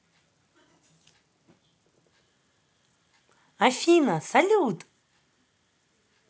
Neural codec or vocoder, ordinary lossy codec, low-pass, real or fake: none; none; none; real